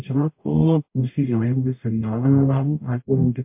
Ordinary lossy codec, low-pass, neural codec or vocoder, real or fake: MP3, 32 kbps; 3.6 kHz; codec, 44.1 kHz, 0.9 kbps, DAC; fake